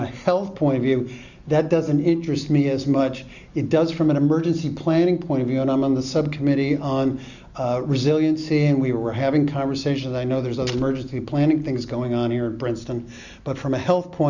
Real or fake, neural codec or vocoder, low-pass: real; none; 7.2 kHz